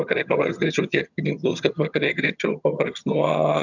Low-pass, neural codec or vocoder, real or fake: 7.2 kHz; vocoder, 22.05 kHz, 80 mel bands, HiFi-GAN; fake